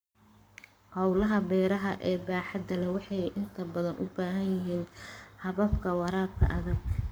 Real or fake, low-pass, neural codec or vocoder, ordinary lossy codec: fake; none; codec, 44.1 kHz, 7.8 kbps, Pupu-Codec; none